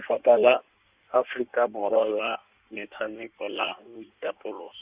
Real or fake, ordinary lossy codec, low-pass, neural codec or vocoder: fake; Opus, 64 kbps; 3.6 kHz; codec, 16 kHz in and 24 kHz out, 1.1 kbps, FireRedTTS-2 codec